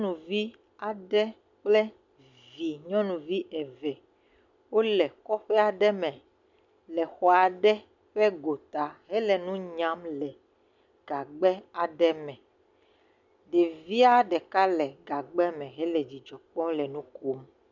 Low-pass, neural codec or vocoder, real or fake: 7.2 kHz; none; real